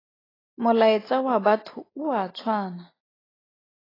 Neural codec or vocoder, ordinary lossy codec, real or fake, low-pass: none; AAC, 24 kbps; real; 5.4 kHz